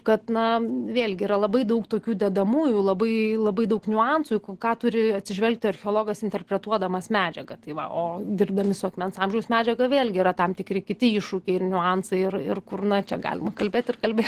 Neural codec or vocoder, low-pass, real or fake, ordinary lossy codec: none; 14.4 kHz; real; Opus, 16 kbps